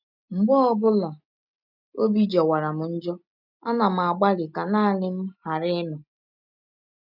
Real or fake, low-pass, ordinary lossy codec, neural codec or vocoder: real; 5.4 kHz; none; none